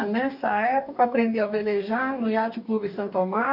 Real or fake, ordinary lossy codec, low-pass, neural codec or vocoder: fake; none; 5.4 kHz; codec, 44.1 kHz, 2.6 kbps, DAC